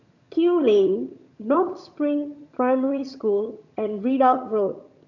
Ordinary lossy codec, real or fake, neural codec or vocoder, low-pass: none; fake; vocoder, 22.05 kHz, 80 mel bands, HiFi-GAN; 7.2 kHz